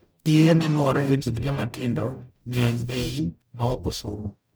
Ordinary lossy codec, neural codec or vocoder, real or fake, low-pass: none; codec, 44.1 kHz, 0.9 kbps, DAC; fake; none